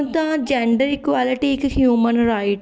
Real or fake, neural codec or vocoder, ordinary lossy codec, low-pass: real; none; none; none